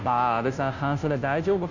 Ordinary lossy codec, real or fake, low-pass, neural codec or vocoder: none; fake; 7.2 kHz; codec, 16 kHz, 0.5 kbps, FunCodec, trained on Chinese and English, 25 frames a second